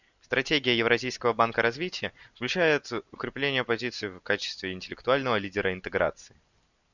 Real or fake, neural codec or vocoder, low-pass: real; none; 7.2 kHz